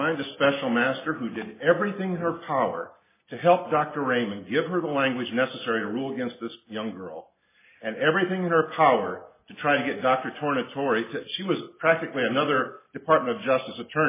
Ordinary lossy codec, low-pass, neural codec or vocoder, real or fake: MP3, 16 kbps; 3.6 kHz; none; real